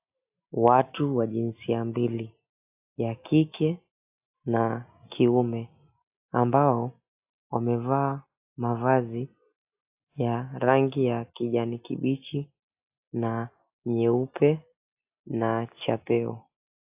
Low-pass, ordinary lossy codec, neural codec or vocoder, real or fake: 3.6 kHz; AAC, 32 kbps; none; real